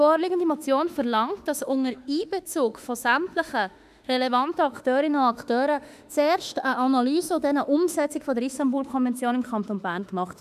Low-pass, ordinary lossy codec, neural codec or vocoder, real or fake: 14.4 kHz; none; autoencoder, 48 kHz, 32 numbers a frame, DAC-VAE, trained on Japanese speech; fake